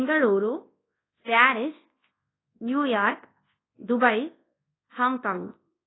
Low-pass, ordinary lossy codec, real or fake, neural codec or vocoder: 7.2 kHz; AAC, 16 kbps; fake; codec, 24 kHz, 0.9 kbps, WavTokenizer, large speech release